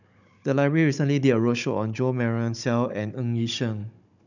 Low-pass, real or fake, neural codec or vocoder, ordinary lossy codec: 7.2 kHz; fake; codec, 16 kHz, 16 kbps, FunCodec, trained on Chinese and English, 50 frames a second; none